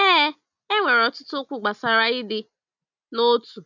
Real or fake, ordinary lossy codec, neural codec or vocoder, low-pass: real; none; none; 7.2 kHz